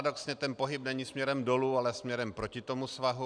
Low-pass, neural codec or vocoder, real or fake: 9.9 kHz; none; real